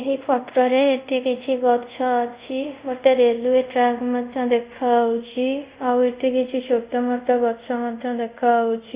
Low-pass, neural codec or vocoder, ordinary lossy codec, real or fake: 3.6 kHz; codec, 24 kHz, 0.5 kbps, DualCodec; Opus, 64 kbps; fake